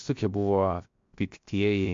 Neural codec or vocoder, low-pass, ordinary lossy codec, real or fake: codec, 16 kHz, 0.7 kbps, FocalCodec; 7.2 kHz; MP3, 48 kbps; fake